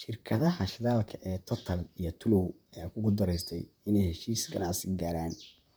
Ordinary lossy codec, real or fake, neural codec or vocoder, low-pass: none; fake; vocoder, 44.1 kHz, 128 mel bands, Pupu-Vocoder; none